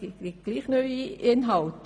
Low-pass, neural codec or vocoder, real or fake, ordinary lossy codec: none; none; real; none